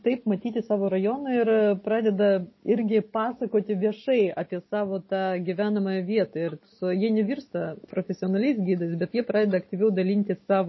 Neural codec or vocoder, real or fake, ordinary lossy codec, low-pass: none; real; MP3, 24 kbps; 7.2 kHz